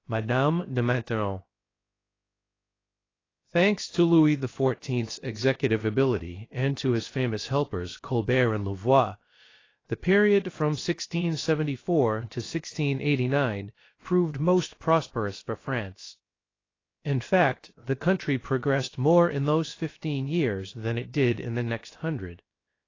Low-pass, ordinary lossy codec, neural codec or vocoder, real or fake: 7.2 kHz; AAC, 32 kbps; codec, 16 kHz, about 1 kbps, DyCAST, with the encoder's durations; fake